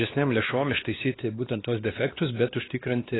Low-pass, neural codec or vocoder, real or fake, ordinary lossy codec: 7.2 kHz; codec, 16 kHz, 2 kbps, X-Codec, WavLM features, trained on Multilingual LibriSpeech; fake; AAC, 16 kbps